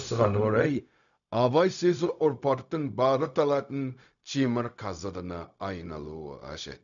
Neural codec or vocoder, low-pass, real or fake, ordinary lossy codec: codec, 16 kHz, 0.4 kbps, LongCat-Audio-Codec; 7.2 kHz; fake; none